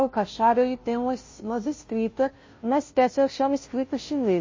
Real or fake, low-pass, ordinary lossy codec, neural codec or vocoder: fake; 7.2 kHz; MP3, 32 kbps; codec, 16 kHz, 0.5 kbps, FunCodec, trained on Chinese and English, 25 frames a second